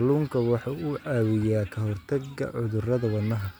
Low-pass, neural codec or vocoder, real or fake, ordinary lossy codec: none; none; real; none